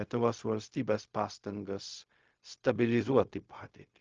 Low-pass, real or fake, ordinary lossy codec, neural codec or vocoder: 7.2 kHz; fake; Opus, 24 kbps; codec, 16 kHz, 0.4 kbps, LongCat-Audio-Codec